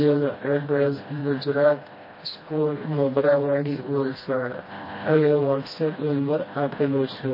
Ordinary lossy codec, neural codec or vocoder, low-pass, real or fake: MP3, 24 kbps; codec, 16 kHz, 1 kbps, FreqCodec, smaller model; 5.4 kHz; fake